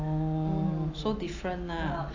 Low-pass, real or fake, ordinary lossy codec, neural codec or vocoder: 7.2 kHz; real; AAC, 48 kbps; none